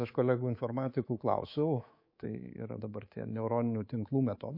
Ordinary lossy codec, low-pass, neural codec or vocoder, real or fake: MP3, 32 kbps; 5.4 kHz; codec, 24 kHz, 3.1 kbps, DualCodec; fake